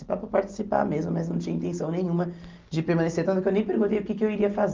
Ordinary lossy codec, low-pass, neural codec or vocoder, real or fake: Opus, 24 kbps; 7.2 kHz; none; real